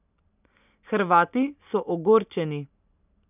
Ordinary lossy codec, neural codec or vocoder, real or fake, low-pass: none; none; real; 3.6 kHz